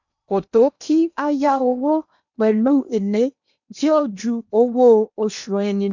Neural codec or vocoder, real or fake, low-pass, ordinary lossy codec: codec, 16 kHz in and 24 kHz out, 0.8 kbps, FocalCodec, streaming, 65536 codes; fake; 7.2 kHz; none